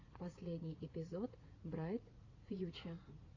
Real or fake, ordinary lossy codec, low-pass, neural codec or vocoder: fake; AAC, 32 kbps; 7.2 kHz; vocoder, 44.1 kHz, 80 mel bands, Vocos